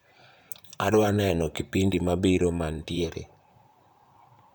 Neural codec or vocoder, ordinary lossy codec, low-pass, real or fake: vocoder, 44.1 kHz, 128 mel bands, Pupu-Vocoder; none; none; fake